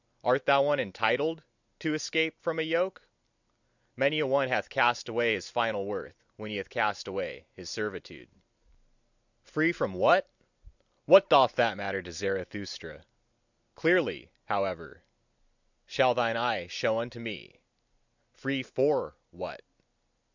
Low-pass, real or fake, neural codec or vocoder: 7.2 kHz; real; none